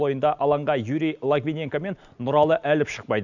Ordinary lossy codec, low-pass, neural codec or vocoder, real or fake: none; 7.2 kHz; none; real